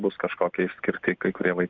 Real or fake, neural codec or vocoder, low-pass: real; none; 7.2 kHz